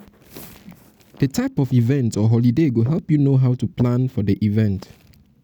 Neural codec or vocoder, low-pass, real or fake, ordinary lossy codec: none; none; real; none